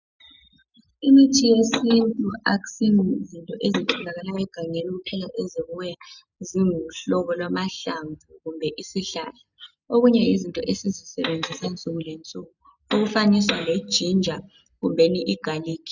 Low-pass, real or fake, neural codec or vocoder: 7.2 kHz; real; none